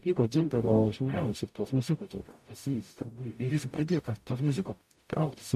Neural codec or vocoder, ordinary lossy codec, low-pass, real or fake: codec, 44.1 kHz, 0.9 kbps, DAC; MP3, 64 kbps; 14.4 kHz; fake